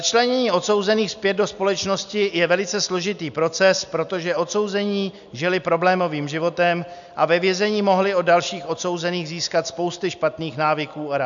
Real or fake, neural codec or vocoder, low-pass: real; none; 7.2 kHz